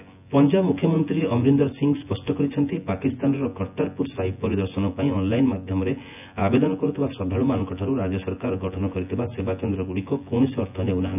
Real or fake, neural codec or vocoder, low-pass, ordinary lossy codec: fake; vocoder, 24 kHz, 100 mel bands, Vocos; 3.6 kHz; none